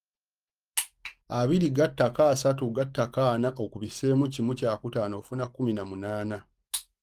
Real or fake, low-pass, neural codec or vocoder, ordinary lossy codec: fake; 14.4 kHz; codec, 44.1 kHz, 7.8 kbps, DAC; Opus, 32 kbps